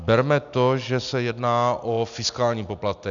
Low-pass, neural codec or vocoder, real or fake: 7.2 kHz; none; real